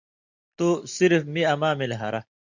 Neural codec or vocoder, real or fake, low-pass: none; real; 7.2 kHz